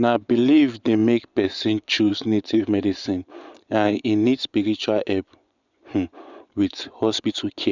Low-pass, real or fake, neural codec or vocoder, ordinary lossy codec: 7.2 kHz; fake; vocoder, 24 kHz, 100 mel bands, Vocos; none